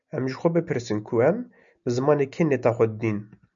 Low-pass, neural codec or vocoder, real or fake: 7.2 kHz; none; real